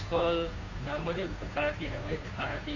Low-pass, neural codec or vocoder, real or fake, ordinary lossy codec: 7.2 kHz; codec, 16 kHz, 2 kbps, FunCodec, trained on Chinese and English, 25 frames a second; fake; none